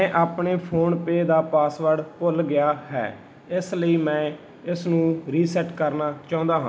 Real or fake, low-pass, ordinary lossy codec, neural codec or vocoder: real; none; none; none